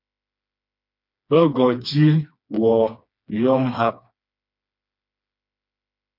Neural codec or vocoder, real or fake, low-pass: codec, 16 kHz, 2 kbps, FreqCodec, smaller model; fake; 5.4 kHz